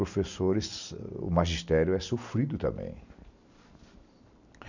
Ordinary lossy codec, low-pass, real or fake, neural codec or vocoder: none; 7.2 kHz; real; none